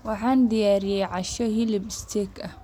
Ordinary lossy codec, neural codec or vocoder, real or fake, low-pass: none; none; real; 19.8 kHz